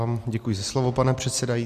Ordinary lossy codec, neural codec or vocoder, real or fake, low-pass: MP3, 64 kbps; none; real; 14.4 kHz